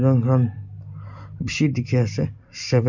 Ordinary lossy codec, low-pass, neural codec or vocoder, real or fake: none; 7.2 kHz; none; real